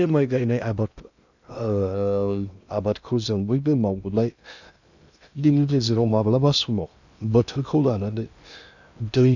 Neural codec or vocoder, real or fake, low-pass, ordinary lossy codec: codec, 16 kHz in and 24 kHz out, 0.6 kbps, FocalCodec, streaming, 2048 codes; fake; 7.2 kHz; none